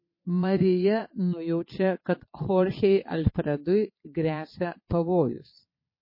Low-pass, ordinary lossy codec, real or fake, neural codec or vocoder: 5.4 kHz; MP3, 24 kbps; fake; vocoder, 22.05 kHz, 80 mel bands, Vocos